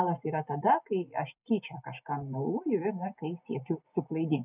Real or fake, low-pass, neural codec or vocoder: real; 3.6 kHz; none